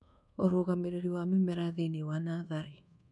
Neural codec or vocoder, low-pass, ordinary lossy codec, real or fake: codec, 24 kHz, 1.2 kbps, DualCodec; 10.8 kHz; none; fake